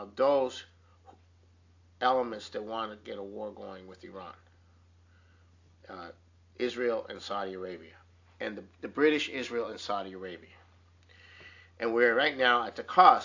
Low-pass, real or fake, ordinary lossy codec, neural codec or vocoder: 7.2 kHz; real; AAC, 48 kbps; none